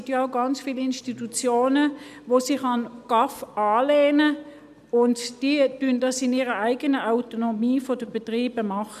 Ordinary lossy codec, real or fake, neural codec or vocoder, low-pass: none; real; none; 14.4 kHz